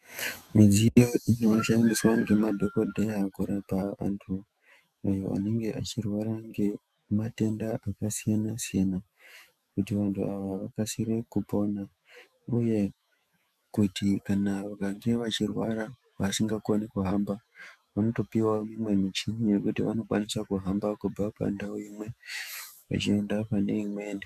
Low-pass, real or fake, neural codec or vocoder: 14.4 kHz; fake; autoencoder, 48 kHz, 128 numbers a frame, DAC-VAE, trained on Japanese speech